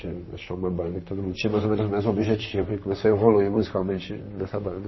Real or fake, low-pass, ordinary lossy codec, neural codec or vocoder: fake; 7.2 kHz; MP3, 24 kbps; vocoder, 44.1 kHz, 128 mel bands, Pupu-Vocoder